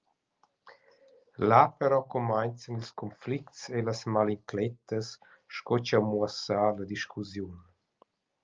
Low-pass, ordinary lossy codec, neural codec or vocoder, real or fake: 7.2 kHz; Opus, 24 kbps; none; real